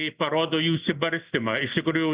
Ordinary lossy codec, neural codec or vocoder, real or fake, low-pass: Opus, 64 kbps; none; real; 3.6 kHz